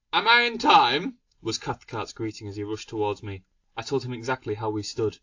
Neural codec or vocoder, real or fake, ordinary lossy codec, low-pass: none; real; AAC, 48 kbps; 7.2 kHz